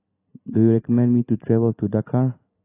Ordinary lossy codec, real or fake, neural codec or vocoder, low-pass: AAC, 24 kbps; real; none; 3.6 kHz